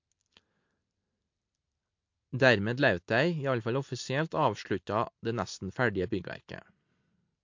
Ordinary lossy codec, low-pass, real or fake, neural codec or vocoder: MP3, 48 kbps; 7.2 kHz; real; none